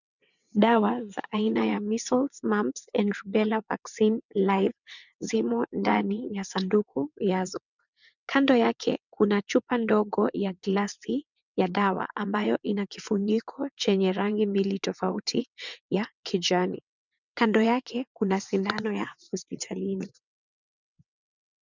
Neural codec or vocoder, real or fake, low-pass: vocoder, 22.05 kHz, 80 mel bands, WaveNeXt; fake; 7.2 kHz